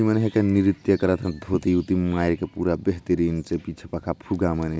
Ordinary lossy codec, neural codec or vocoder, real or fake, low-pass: none; none; real; none